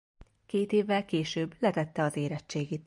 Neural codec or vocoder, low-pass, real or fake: none; 10.8 kHz; real